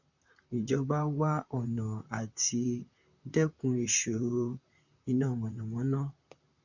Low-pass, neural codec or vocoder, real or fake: 7.2 kHz; vocoder, 44.1 kHz, 128 mel bands, Pupu-Vocoder; fake